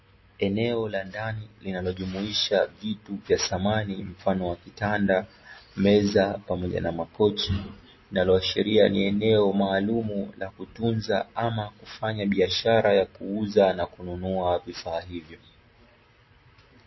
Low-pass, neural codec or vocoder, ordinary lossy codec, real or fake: 7.2 kHz; none; MP3, 24 kbps; real